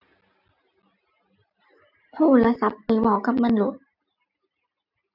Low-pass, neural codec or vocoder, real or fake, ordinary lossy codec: 5.4 kHz; none; real; AAC, 48 kbps